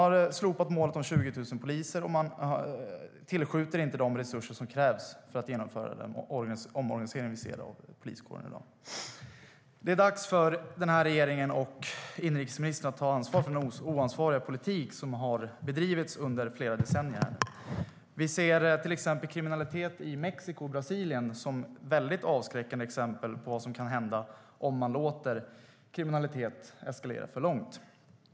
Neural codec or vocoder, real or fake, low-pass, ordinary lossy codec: none; real; none; none